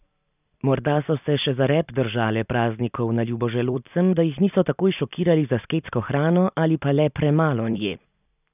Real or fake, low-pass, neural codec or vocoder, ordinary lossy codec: fake; 3.6 kHz; vocoder, 44.1 kHz, 128 mel bands every 256 samples, BigVGAN v2; none